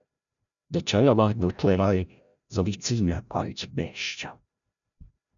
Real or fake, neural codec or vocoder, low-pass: fake; codec, 16 kHz, 0.5 kbps, FreqCodec, larger model; 7.2 kHz